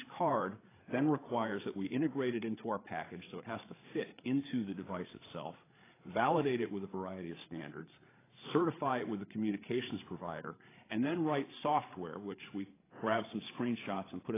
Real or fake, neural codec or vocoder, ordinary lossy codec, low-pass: fake; codec, 16 kHz, 8 kbps, FreqCodec, larger model; AAC, 16 kbps; 3.6 kHz